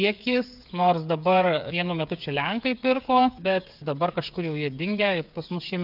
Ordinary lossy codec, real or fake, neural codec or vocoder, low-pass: AAC, 48 kbps; fake; codec, 16 kHz, 8 kbps, FreqCodec, smaller model; 5.4 kHz